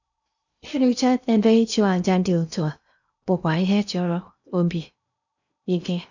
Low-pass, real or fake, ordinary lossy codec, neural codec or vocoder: 7.2 kHz; fake; none; codec, 16 kHz in and 24 kHz out, 0.6 kbps, FocalCodec, streaming, 2048 codes